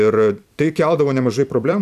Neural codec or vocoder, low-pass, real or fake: vocoder, 44.1 kHz, 128 mel bands every 256 samples, BigVGAN v2; 14.4 kHz; fake